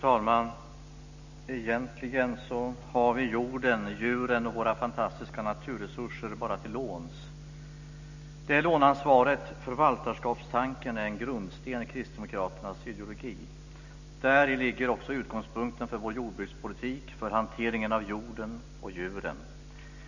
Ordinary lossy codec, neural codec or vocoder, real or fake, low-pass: none; none; real; 7.2 kHz